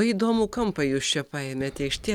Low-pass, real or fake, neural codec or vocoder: 19.8 kHz; real; none